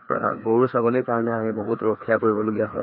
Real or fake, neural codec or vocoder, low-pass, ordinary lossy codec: fake; codec, 16 kHz, 2 kbps, FreqCodec, larger model; 5.4 kHz; none